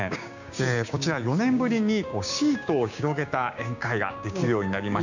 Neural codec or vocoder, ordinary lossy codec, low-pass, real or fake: codec, 16 kHz, 6 kbps, DAC; none; 7.2 kHz; fake